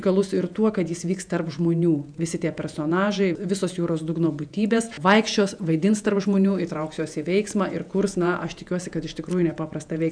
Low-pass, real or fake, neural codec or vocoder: 9.9 kHz; fake; vocoder, 48 kHz, 128 mel bands, Vocos